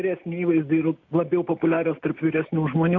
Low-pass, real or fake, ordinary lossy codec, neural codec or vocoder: 7.2 kHz; real; AAC, 48 kbps; none